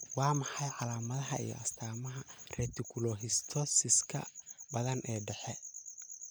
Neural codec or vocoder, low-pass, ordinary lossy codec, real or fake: none; none; none; real